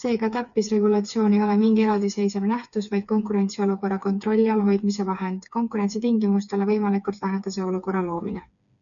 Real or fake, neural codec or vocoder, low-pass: fake; codec, 16 kHz, 4 kbps, FreqCodec, smaller model; 7.2 kHz